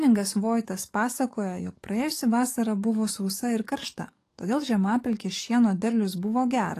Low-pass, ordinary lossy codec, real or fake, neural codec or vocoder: 14.4 kHz; AAC, 48 kbps; fake; codec, 44.1 kHz, 7.8 kbps, DAC